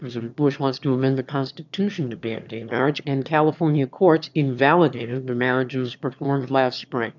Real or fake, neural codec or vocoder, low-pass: fake; autoencoder, 22.05 kHz, a latent of 192 numbers a frame, VITS, trained on one speaker; 7.2 kHz